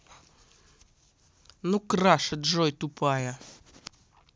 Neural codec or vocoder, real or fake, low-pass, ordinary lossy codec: none; real; none; none